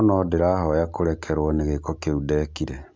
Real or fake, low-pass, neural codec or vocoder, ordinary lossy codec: real; none; none; none